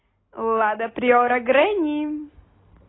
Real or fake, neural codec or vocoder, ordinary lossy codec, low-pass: real; none; AAC, 16 kbps; 7.2 kHz